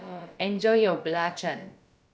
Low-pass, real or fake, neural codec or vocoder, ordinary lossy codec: none; fake; codec, 16 kHz, about 1 kbps, DyCAST, with the encoder's durations; none